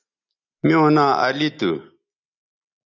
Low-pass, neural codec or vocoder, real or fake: 7.2 kHz; none; real